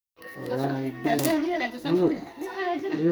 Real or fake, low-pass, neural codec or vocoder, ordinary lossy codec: fake; none; codec, 44.1 kHz, 2.6 kbps, SNAC; none